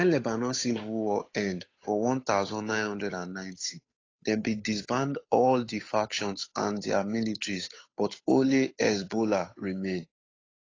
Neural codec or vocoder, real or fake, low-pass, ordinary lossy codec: codec, 16 kHz, 8 kbps, FunCodec, trained on Chinese and English, 25 frames a second; fake; 7.2 kHz; AAC, 32 kbps